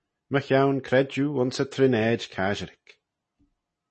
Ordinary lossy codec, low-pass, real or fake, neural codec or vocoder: MP3, 32 kbps; 9.9 kHz; real; none